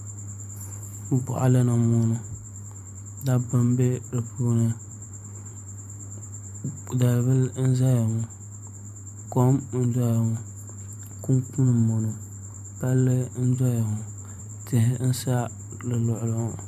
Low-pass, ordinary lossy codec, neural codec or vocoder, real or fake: 14.4 kHz; MP3, 64 kbps; vocoder, 44.1 kHz, 128 mel bands every 512 samples, BigVGAN v2; fake